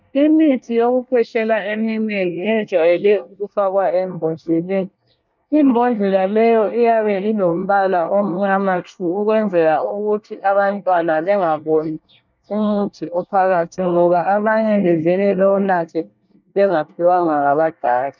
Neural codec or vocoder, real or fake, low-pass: codec, 24 kHz, 1 kbps, SNAC; fake; 7.2 kHz